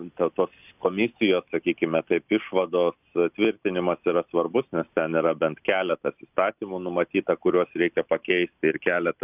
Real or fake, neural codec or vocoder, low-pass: real; none; 3.6 kHz